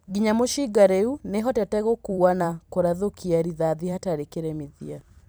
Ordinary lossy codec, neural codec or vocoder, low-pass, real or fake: none; vocoder, 44.1 kHz, 128 mel bands every 256 samples, BigVGAN v2; none; fake